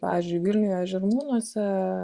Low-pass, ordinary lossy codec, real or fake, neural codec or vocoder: 10.8 kHz; Opus, 64 kbps; fake; vocoder, 24 kHz, 100 mel bands, Vocos